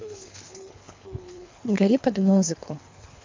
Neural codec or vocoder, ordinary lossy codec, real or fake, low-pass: codec, 24 kHz, 3 kbps, HILCodec; MP3, 48 kbps; fake; 7.2 kHz